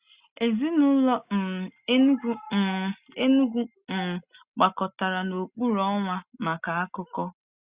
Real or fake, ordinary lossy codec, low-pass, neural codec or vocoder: real; Opus, 64 kbps; 3.6 kHz; none